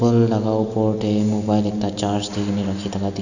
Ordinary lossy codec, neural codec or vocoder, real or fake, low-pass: none; none; real; 7.2 kHz